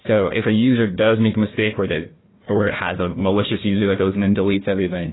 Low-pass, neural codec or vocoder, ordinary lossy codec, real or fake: 7.2 kHz; codec, 16 kHz, 1 kbps, FunCodec, trained on Chinese and English, 50 frames a second; AAC, 16 kbps; fake